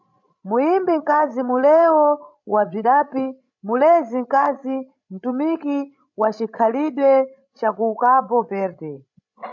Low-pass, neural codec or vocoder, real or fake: 7.2 kHz; codec, 16 kHz, 16 kbps, FreqCodec, larger model; fake